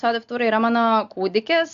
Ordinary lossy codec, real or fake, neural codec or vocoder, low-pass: Opus, 64 kbps; real; none; 7.2 kHz